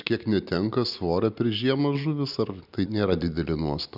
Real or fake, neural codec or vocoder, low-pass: real; none; 5.4 kHz